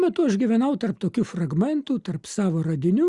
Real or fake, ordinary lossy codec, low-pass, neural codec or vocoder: real; Opus, 64 kbps; 10.8 kHz; none